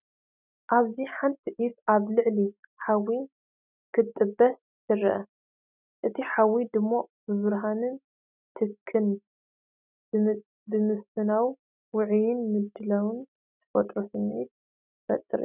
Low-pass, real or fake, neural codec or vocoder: 3.6 kHz; real; none